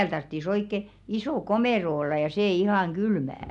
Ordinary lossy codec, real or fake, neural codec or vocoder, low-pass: none; real; none; 10.8 kHz